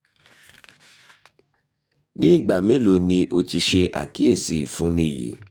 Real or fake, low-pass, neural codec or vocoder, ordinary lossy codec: fake; 19.8 kHz; codec, 44.1 kHz, 2.6 kbps, DAC; none